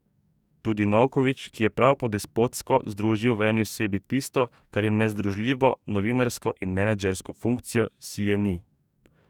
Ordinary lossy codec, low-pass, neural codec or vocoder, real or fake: none; 19.8 kHz; codec, 44.1 kHz, 2.6 kbps, DAC; fake